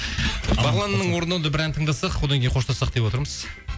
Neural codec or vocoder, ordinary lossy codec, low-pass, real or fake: none; none; none; real